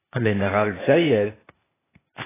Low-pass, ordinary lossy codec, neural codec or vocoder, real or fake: 3.6 kHz; AAC, 16 kbps; codec, 16 kHz, 0.8 kbps, ZipCodec; fake